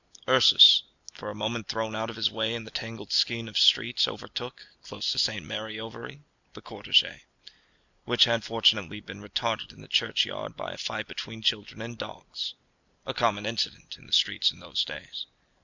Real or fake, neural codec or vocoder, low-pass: real; none; 7.2 kHz